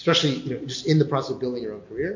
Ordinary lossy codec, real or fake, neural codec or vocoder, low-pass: MP3, 48 kbps; real; none; 7.2 kHz